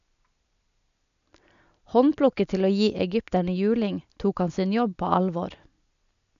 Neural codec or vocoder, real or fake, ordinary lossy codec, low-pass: none; real; none; 7.2 kHz